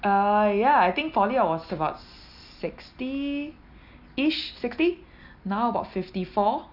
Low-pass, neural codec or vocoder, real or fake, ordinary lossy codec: 5.4 kHz; none; real; none